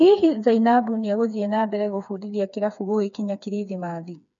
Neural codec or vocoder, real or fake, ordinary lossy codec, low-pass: codec, 16 kHz, 4 kbps, FreqCodec, smaller model; fake; none; 7.2 kHz